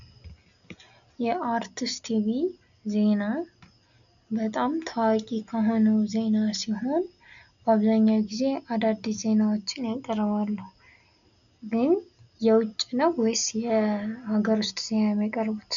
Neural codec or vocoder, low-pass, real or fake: none; 7.2 kHz; real